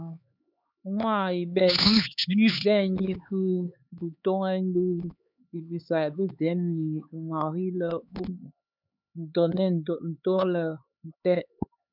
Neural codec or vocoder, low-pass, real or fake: codec, 16 kHz, 4 kbps, X-Codec, HuBERT features, trained on LibriSpeech; 5.4 kHz; fake